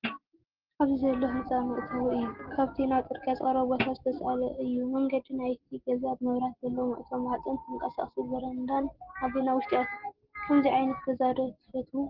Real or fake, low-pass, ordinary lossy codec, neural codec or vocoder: real; 5.4 kHz; Opus, 16 kbps; none